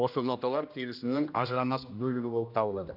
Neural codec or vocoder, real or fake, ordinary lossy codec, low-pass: codec, 16 kHz, 1 kbps, X-Codec, HuBERT features, trained on general audio; fake; none; 5.4 kHz